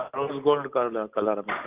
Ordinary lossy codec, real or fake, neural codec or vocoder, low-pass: Opus, 24 kbps; real; none; 3.6 kHz